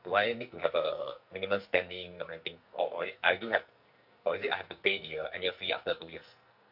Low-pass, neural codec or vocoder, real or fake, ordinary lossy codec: 5.4 kHz; codec, 44.1 kHz, 2.6 kbps, SNAC; fake; none